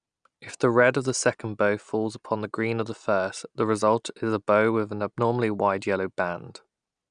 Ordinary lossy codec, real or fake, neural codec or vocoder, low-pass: none; real; none; 9.9 kHz